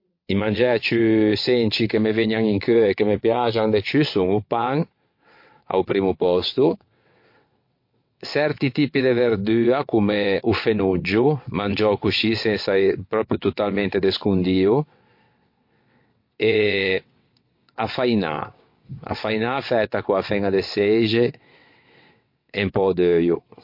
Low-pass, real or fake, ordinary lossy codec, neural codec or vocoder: 5.4 kHz; fake; MP3, 32 kbps; vocoder, 22.05 kHz, 80 mel bands, WaveNeXt